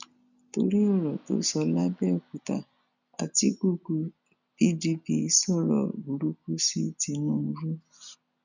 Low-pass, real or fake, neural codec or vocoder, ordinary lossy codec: 7.2 kHz; real; none; none